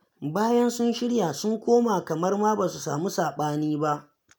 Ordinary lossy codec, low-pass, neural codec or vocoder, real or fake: none; none; none; real